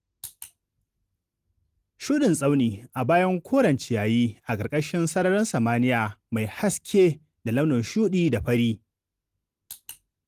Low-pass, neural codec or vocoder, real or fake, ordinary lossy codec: 14.4 kHz; none; real; Opus, 24 kbps